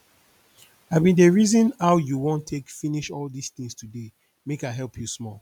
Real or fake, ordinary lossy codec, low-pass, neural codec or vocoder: real; none; 19.8 kHz; none